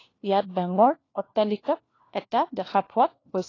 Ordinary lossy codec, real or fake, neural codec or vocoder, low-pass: AAC, 32 kbps; fake; codec, 16 kHz, 1 kbps, FunCodec, trained on LibriTTS, 50 frames a second; 7.2 kHz